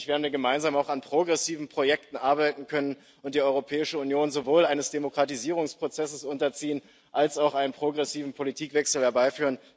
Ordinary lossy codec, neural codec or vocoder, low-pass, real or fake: none; none; none; real